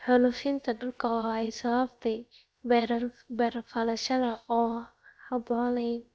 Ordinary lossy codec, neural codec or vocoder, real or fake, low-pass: none; codec, 16 kHz, about 1 kbps, DyCAST, with the encoder's durations; fake; none